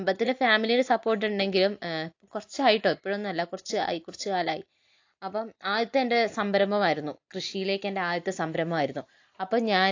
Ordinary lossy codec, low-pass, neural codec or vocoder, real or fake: AAC, 48 kbps; 7.2 kHz; none; real